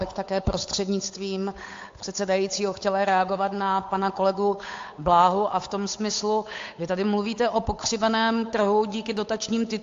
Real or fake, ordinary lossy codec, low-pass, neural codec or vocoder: fake; AAC, 48 kbps; 7.2 kHz; codec, 16 kHz, 8 kbps, FunCodec, trained on Chinese and English, 25 frames a second